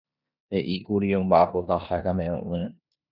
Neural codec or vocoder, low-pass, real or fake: codec, 16 kHz in and 24 kHz out, 0.9 kbps, LongCat-Audio-Codec, four codebook decoder; 5.4 kHz; fake